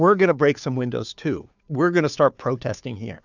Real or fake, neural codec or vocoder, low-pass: fake; codec, 16 kHz, 2 kbps, FunCodec, trained on Chinese and English, 25 frames a second; 7.2 kHz